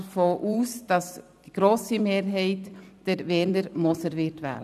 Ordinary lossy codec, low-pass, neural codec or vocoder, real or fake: none; 14.4 kHz; vocoder, 44.1 kHz, 128 mel bands every 256 samples, BigVGAN v2; fake